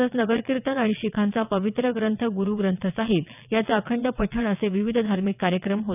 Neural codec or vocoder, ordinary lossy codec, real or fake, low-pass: vocoder, 22.05 kHz, 80 mel bands, WaveNeXt; none; fake; 3.6 kHz